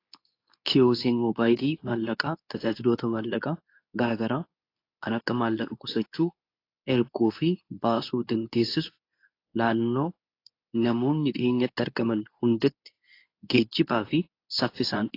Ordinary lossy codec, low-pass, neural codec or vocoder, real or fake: AAC, 32 kbps; 5.4 kHz; codec, 24 kHz, 0.9 kbps, WavTokenizer, medium speech release version 2; fake